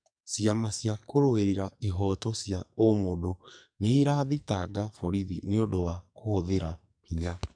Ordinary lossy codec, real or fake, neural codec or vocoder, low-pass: none; fake; codec, 44.1 kHz, 2.6 kbps, SNAC; 9.9 kHz